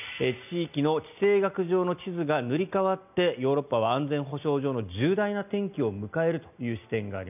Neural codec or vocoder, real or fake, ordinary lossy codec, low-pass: none; real; none; 3.6 kHz